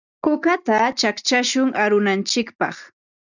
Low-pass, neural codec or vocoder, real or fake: 7.2 kHz; none; real